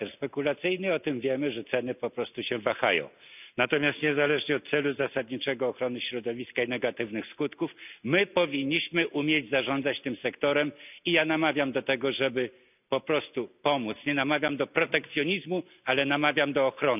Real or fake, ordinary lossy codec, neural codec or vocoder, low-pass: real; none; none; 3.6 kHz